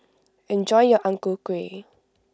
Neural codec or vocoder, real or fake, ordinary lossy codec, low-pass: none; real; none; none